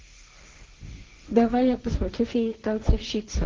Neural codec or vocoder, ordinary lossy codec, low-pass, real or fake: codec, 16 kHz, 1.1 kbps, Voila-Tokenizer; Opus, 16 kbps; 7.2 kHz; fake